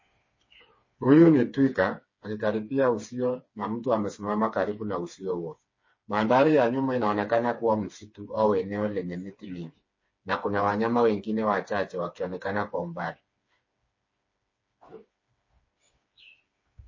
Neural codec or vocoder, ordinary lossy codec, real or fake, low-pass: codec, 16 kHz, 4 kbps, FreqCodec, smaller model; MP3, 32 kbps; fake; 7.2 kHz